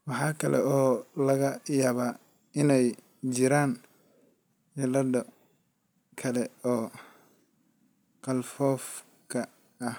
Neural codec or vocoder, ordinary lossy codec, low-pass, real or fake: none; none; none; real